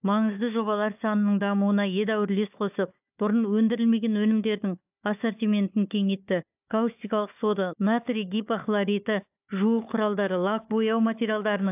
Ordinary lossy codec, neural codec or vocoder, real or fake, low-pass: AAC, 32 kbps; codec, 16 kHz, 4 kbps, FunCodec, trained on Chinese and English, 50 frames a second; fake; 3.6 kHz